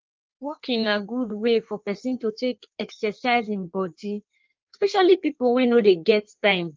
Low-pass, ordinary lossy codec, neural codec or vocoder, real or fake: 7.2 kHz; Opus, 24 kbps; codec, 16 kHz in and 24 kHz out, 1.1 kbps, FireRedTTS-2 codec; fake